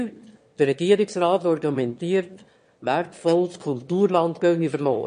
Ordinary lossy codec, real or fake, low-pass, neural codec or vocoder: MP3, 48 kbps; fake; 9.9 kHz; autoencoder, 22.05 kHz, a latent of 192 numbers a frame, VITS, trained on one speaker